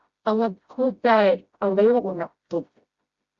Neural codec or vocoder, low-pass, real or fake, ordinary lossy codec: codec, 16 kHz, 0.5 kbps, FreqCodec, smaller model; 7.2 kHz; fake; Opus, 64 kbps